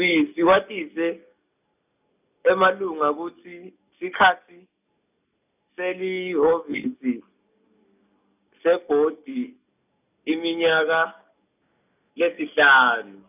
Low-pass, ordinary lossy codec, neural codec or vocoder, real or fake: 3.6 kHz; none; none; real